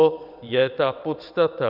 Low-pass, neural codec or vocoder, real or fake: 5.4 kHz; vocoder, 22.05 kHz, 80 mel bands, WaveNeXt; fake